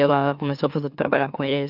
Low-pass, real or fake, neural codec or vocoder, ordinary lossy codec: 5.4 kHz; fake; autoencoder, 44.1 kHz, a latent of 192 numbers a frame, MeloTTS; none